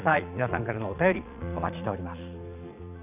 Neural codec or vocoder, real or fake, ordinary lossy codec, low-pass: vocoder, 22.05 kHz, 80 mel bands, Vocos; fake; none; 3.6 kHz